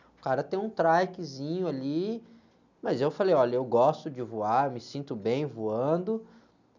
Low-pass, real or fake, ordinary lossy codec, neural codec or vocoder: 7.2 kHz; real; none; none